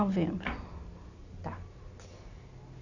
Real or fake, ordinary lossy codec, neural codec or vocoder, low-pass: real; none; none; 7.2 kHz